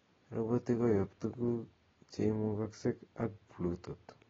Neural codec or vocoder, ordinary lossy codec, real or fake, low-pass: none; AAC, 24 kbps; real; 7.2 kHz